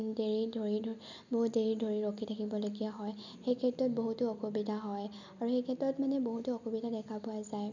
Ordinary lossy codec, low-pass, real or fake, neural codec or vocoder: none; 7.2 kHz; real; none